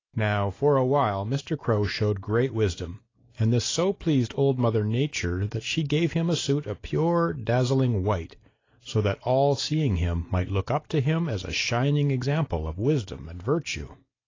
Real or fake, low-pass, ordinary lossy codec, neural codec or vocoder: real; 7.2 kHz; AAC, 32 kbps; none